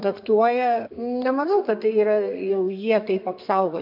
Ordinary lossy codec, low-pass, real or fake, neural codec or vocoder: MP3, 48 kbps; 5.4 kHz; fake; codec, 32 kHz, 1.9 kbps, SNAC